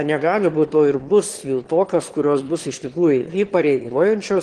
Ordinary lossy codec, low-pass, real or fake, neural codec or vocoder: Opus, 16 kbps; 9.9 kHz; fake; autoencoder, 22.05 kHz, a latent of 192 numbers a frame, VITS, trained on one speaker